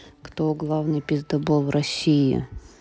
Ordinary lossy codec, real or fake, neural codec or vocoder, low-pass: none; real; none; none